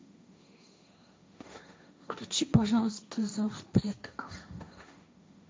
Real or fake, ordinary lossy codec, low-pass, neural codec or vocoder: fake; none; none; codec, 16 kHz, 1.1 kbps, Voila-Tokenizer